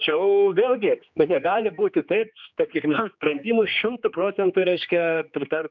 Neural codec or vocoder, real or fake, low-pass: codec, 16 kHz, 2 kbps, X-Codec, HuBERT features, trained on general audio; fake; 7.2 kHz